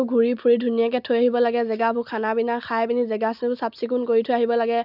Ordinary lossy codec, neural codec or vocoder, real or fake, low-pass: none; none; real; 5.4 kHz